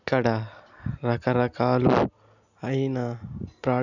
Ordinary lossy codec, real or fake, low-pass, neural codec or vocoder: none; real; 7.2 kHz; none